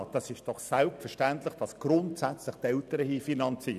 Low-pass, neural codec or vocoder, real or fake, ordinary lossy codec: 14.4 kHz; none; real; none